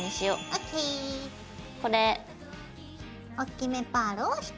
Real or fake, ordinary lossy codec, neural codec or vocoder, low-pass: real; none; none; none